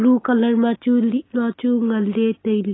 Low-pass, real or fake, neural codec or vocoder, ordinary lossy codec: 7.2 kHz; real; none; AAC, 16 kbps